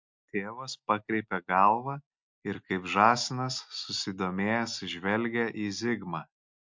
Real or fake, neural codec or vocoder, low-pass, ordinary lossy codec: real; none; 7.2 kHz; MP3, 48 kbps